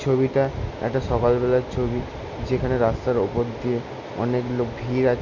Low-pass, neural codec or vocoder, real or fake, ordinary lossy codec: 7.2 kHz; none; real; none